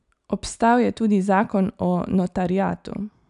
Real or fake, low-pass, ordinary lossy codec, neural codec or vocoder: real; 10.8 kHz; none; none